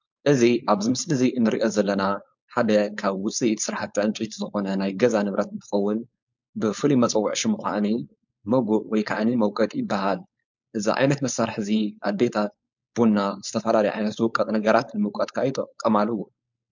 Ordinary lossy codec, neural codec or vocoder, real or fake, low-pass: MP3, 64 kbps; codec, 16 kHz, 4.8 kbps, FACodec; fake; 7.2 kHz